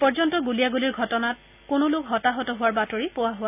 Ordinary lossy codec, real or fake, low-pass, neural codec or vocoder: none; real; 3.6 kHz; none